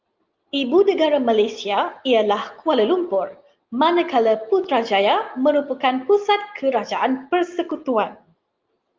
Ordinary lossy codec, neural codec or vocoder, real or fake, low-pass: Opus, 24 kbps; none; real; 7.2 kHz